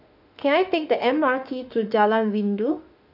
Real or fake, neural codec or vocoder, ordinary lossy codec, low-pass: fake; autoencoder, 48 kHz, 32 numbers a frame, DAC-VAE, trained on Japanese speech; none; 5.4 kHz